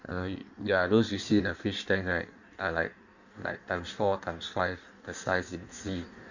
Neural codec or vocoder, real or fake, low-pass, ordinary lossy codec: codec, 16 kHz in and 24 kHz out, 1.1 kbps, FireRedTTS-2 codec; fake; 7.2 kHz; none